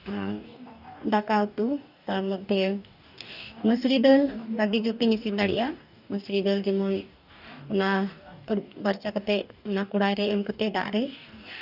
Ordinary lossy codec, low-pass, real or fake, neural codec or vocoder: none; 5.4 kHz; fake; codec, 44.1 kHz, 2.6 kbps, DAC